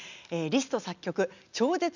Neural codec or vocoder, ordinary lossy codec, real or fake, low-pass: none; none; real; 7.2 kHz